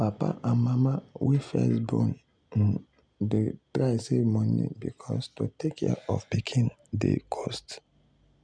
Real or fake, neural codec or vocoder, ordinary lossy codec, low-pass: real; none; none; 9.9 kHz